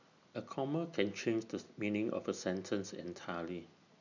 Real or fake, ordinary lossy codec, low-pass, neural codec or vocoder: real; none; 7.2 kHz; none